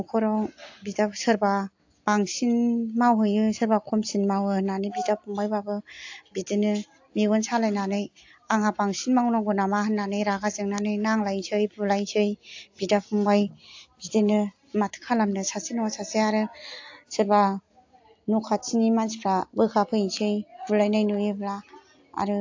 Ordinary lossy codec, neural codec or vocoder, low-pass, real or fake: AAC, 48 kbps; none; 7.2 kHz; real